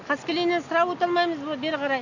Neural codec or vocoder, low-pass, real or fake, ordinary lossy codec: none; 7.2 kHz; real; none